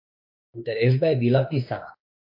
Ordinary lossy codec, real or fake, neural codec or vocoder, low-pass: MP3, 32 kbps; fake; autoencoder, 48 kHz, 32 numbers a frame, DAC-VAE, trained on Japanese speech; 5.4 kHz